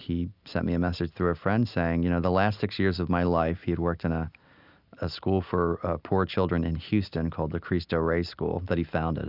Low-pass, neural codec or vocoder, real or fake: 5.4 kHz; none; real